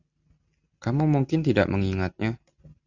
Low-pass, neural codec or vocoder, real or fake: 7.2 kHz; none; real